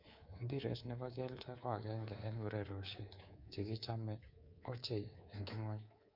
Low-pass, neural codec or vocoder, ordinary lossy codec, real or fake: 5.4 kHz; codec, 24 kHz, 3.1 kbps, DualCodec; Opus, 64 kbps; fake